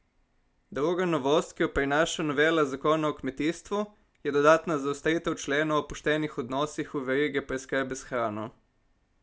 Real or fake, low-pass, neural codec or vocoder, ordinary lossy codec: real; none; none; none